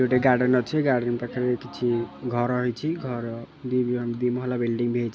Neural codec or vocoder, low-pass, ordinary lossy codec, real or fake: none; none; none; real